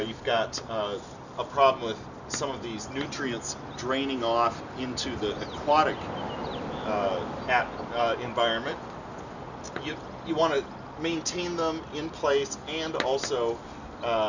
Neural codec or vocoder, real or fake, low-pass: none; real; 7.2 kHz